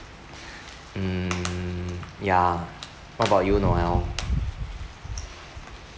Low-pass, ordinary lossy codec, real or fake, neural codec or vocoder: none; none; real; none